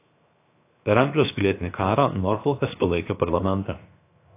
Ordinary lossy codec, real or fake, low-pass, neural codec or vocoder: AAC, 24 kbps; fake; 3.6 kHz; codec, 16 kHz, 0.7 kbps, FocalCodec